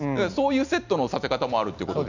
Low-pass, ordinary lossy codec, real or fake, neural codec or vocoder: 7.2 kHz; none; real; none